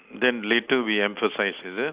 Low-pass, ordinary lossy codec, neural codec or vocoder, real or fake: 3.6 kHz; Opus, 64 kbps; none; real